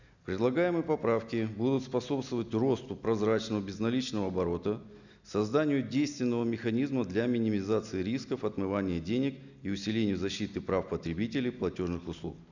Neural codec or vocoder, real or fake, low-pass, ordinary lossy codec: none; real; 7.2 kHz; none